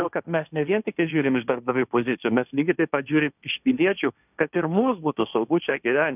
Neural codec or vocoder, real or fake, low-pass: codec, 16 kHz, 1.1 kbps, Voila-Tokenizer; fake; 3.6 kHz